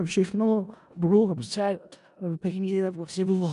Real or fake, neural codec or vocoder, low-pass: fake; codec, 16 kHz in and 24 kHz out, 0.4 kbps, LongCat-Audio-Codec, four codebook decoder; 10.8 kHz